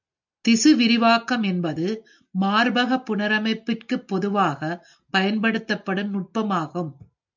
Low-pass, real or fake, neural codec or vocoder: 7.2 kHz; real; none